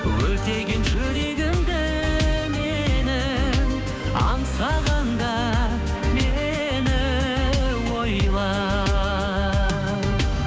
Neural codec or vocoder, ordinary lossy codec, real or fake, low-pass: codec, 16 kHz, 6 kbps, DAC; none; fake; none